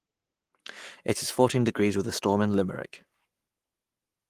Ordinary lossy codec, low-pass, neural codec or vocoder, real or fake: Opus, 24 kbps; 14.4 kHz; codec, 44.1 kHz, 7.8 kbps, Pupu-Codec; fake